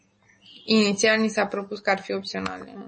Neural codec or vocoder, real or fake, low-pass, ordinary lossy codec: none; real; 10.8 kHz; MP3, 32 kbps